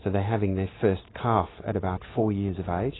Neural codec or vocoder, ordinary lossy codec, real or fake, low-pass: none; AAC, 16 kbps; real; 7.2 kHz